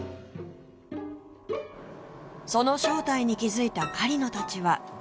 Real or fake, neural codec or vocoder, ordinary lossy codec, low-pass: real; none; none; none